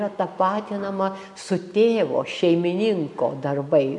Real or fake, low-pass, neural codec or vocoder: real; 10.8 kHz; none